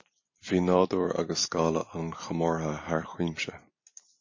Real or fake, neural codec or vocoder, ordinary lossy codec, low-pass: fake; vocoder, 44.1 kHz, 128 mel bands every 256 samples, BigVGAN v2; MP3, 32 kbps; 7.2 kHz